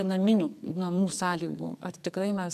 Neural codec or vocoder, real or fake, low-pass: codec, 44.1 kHz, 2.6 kbps, SNAC; fake; 14.4 kHz